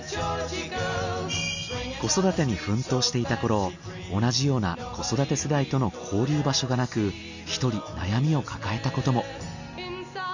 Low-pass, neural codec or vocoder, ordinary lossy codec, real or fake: 7.2 kHz; none; none; real